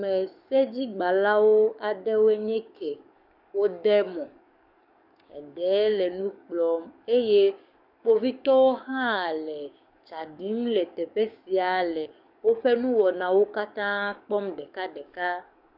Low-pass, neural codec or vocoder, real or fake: 5.4 kHz; codec, 44.1 kHz, 7.8 kbps, DAC; fake